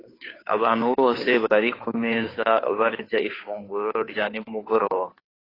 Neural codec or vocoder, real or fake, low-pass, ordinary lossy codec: codec, 16 kHz, 2 kbps, FunCodec, trained on Chinese and English, 25 frames a second; fake; 5.4 kHz; AAC, 24 kbps